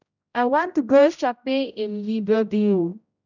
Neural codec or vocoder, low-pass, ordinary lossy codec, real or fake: codec, 16 kHz, 0.5 kbps, X-Codec, HuBERT features, trained on general audio; 7.2 kHz; none; fake